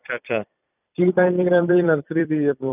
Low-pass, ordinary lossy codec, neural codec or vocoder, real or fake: 3.6 kHz; none; vocoder, 44.1 kHz, 128 mel bands every 256 samples, BigVGAN v2; fake